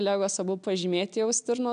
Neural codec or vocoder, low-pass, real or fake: none; 9.9 kHz; real